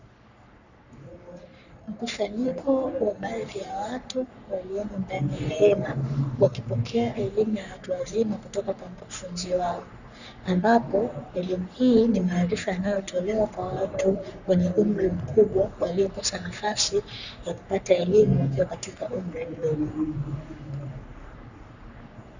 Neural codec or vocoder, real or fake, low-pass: codec, 44.1 kHz, 3.4 kbps, Pupu-Codec; fake; 7.2 kHz